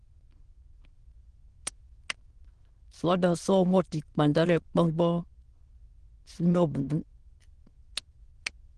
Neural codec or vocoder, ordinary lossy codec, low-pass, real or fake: autoencoder, 22.05 kHz, a latent of 192 numbers a frame, VITS, trained on many speakers; Opus, 16 kbps; 9.9 kHz; fake